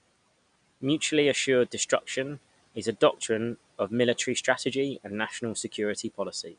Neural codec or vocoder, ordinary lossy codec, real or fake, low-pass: none; none; real; 9.9 kHz